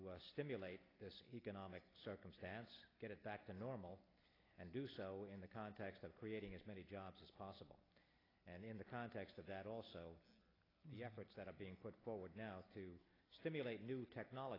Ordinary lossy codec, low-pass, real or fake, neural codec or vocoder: AAC, 24 kbps; 5.4 kHz; real; none